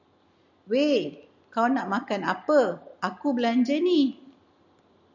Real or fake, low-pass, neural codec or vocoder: real; 7.2 kHz; none